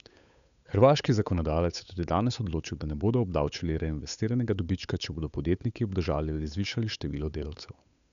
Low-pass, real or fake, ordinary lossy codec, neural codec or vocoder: 7.2 kHz; fake; none; codec, 16 kHz, 8 kbps, FunCodec, trained on Chinese and English, 25 frames a second